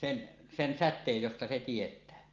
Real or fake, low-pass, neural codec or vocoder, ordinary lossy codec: real; 7.2 kHz; none; Opus, 32 kbps